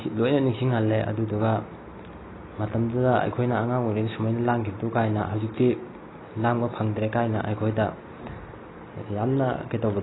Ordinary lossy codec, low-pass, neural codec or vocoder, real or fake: AAC, 16 kbps; 7.2 kHz; none; real